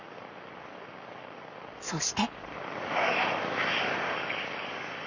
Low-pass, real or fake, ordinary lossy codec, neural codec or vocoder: 7.2 kHz; fake; Opus, 64 kbps; codec, 44.1 kHz, 7.8 kbps, Pupu-Codec